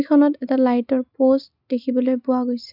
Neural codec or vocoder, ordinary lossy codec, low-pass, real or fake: none; none; 5.4 kHz; real